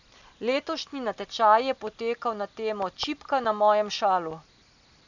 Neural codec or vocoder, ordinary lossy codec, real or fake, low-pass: none; none; real; 7.2 kHz